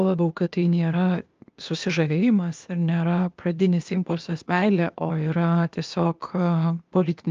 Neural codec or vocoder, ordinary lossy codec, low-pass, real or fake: codec, 16 kHz, 0.8 kbps, ZipCodec; Opus, 24 kbps; 7.2 kHz; fake